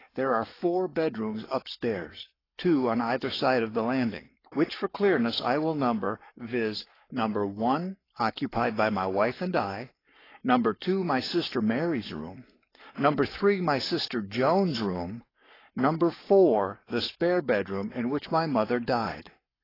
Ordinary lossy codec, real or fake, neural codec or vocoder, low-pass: AAC, 24 kbps; fake; codec, 44.1 kHz, 7.8 kbps, Pupu-Codec; 5.4 kHz